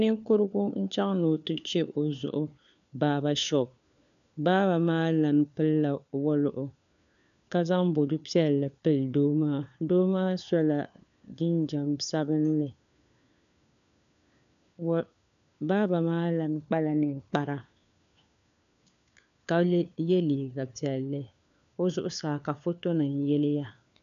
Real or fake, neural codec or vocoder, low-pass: fake; codec, 16 kHz, 4 kbps, FunCodec, trained on LibriTTS, 50 frames a second; 7.2 kHz